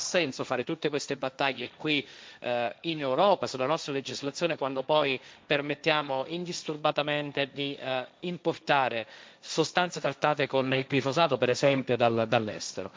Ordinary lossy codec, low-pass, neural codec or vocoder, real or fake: none; none; codec, 16 kHz, 1.1 kbps, Voila-Tokenizer; fake